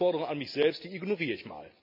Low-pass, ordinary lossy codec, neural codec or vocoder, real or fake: 5.4 kHz; none; none; real